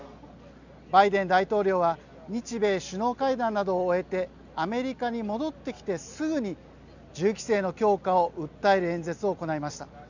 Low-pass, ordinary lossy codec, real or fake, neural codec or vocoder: 7.2 kHz; none; real; none